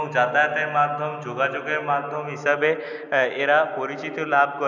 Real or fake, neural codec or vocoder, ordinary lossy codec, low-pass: real; none; none; 7.2 kHz